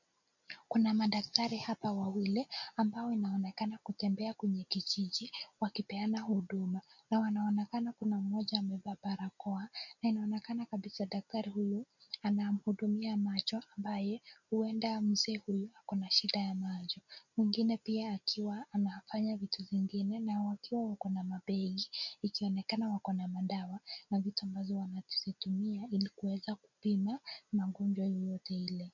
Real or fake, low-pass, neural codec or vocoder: real; 7.2 kHz; none